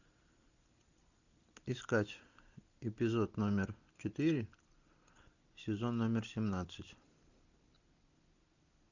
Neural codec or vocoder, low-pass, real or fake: none; 7.2 kHz; real